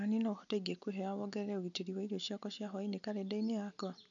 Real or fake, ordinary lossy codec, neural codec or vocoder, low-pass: real; MP3, 96 kbps; none; 7.2 kHz